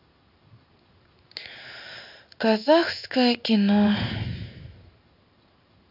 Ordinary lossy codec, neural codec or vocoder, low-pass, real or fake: none; codec, 16 kHz in and 24 kHz out, 1 kbps, XY-Tokenizer; 5.4 kHz; fake